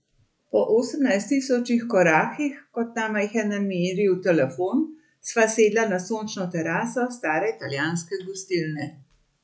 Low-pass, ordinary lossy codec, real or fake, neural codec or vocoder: none; none; real; none